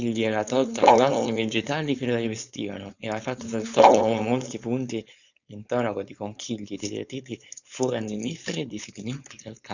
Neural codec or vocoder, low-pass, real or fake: codec, 16 kHz, 4.8 kbps, FACodec; 7.2 kHz; fake